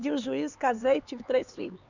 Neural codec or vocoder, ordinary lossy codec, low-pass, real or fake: codec, 16 kHz, 4 kbps, X-Codec, HuBERT features, trained on LibriSpeech; none; 7.2 kHz; fake